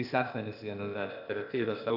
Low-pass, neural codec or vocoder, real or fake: 5.4 kHz; codec, 16 kHz, 0.8 kbps, ZipCodec; fake